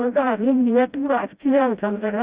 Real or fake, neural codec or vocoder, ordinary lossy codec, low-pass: fake; codec, 16 kHz, 0.5 kbps, FreqCodec, smaller model; Opus, 64 kbps; 3.6 kHz